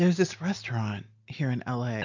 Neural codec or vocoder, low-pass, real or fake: none; 7.2 kHz; real